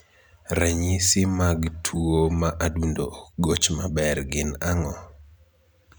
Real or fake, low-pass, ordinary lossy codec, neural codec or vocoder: real; none; none; none